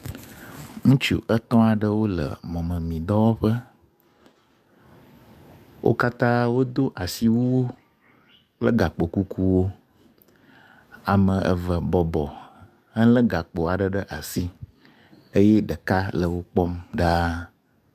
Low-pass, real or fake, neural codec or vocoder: 14.4 kHz; fake; codec, 44.1 kHz, 7.8 kbps, DAC